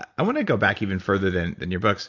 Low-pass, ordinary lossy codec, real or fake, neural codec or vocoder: 7.2 kHz; AAC, 48 kbps; real; none